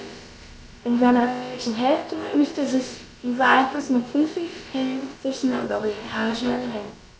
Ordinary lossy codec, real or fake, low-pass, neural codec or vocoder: none; fake; none; codec, 16 kHz, about 1 kbps, DyCAST, with the encoder's durations